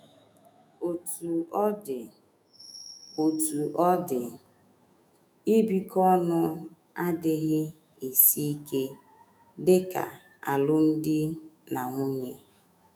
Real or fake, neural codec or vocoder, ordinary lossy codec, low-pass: fake; autoencoder, 48 kHz, 128 numbers a frame, DAC-VAE, trained on Japanese speech; none; none